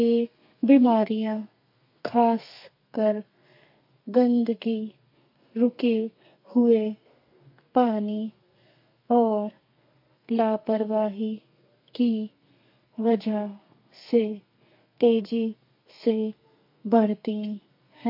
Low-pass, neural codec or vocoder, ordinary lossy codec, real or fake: 5.4 kHz; codec, 32 kHz, 1.9 kbps, SNAC; MP3, 32 kbps; fake